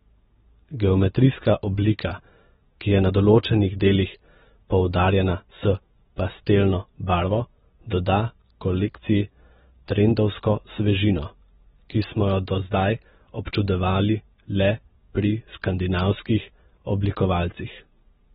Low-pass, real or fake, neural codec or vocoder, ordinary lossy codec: 19.8 kHz; real; none; AAC, 16 kbps